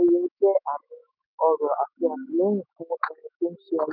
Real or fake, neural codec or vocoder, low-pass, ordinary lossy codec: fake; autoencoder, 48 kHz, 128 numbers a frame, DAC-VAE, trained on Japanese speech; 5.4 kHz; none